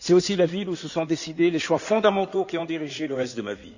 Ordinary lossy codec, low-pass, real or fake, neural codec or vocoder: none; 7.2 kHz; fake; codec, 16 kHz in and 24 kHz out, 2.2 kbps, FireRedTTS-2 codec